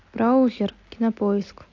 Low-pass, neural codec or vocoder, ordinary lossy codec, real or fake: 7.2 kHz; none; AAC, 48 kbps; real